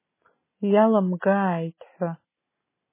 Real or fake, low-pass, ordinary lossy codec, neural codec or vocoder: real; 3.6 kHz; MP3, 16 kbps; none